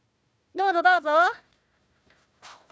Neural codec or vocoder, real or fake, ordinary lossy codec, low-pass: codec, 16 kHz, 1 kbps, FunCodec, trained on Chinese and English, 50 frames a second; fake; none; none